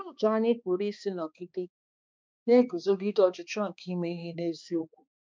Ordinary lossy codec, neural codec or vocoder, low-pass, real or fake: none; codec, 16 kHz, 2 kbps, X-Codec, HuBERT features, trained on balanced general audio; none; fake